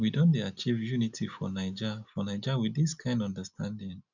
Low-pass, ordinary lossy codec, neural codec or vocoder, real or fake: 7.2 kHz; none; none; real